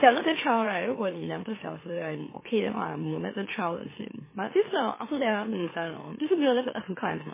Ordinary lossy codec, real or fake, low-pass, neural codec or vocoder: MP3, 16 kbps; fake; 3.6 kHz; autoencoder, 44.1 kHz, a latent of 192 numbers a frame, MeloTTS